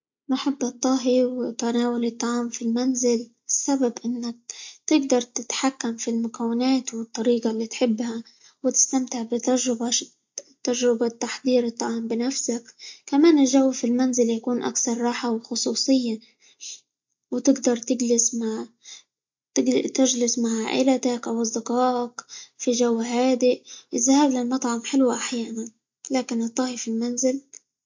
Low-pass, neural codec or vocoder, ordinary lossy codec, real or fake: 7.2 kHz; none; MP3, 48 kbps; real